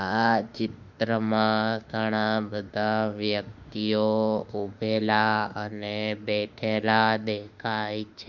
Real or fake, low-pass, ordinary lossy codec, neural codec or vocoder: fake; 7.2 kHz; none; autoencoder, 48 kHz, 32 numbers a frame, DAC-VAE, trained on Japanese speech